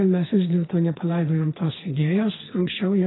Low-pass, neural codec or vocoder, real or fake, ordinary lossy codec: 7.2 kHz; codec, 44.1 kHz, 2.6 kbps, SNAC; fake; AAC, 16 kbps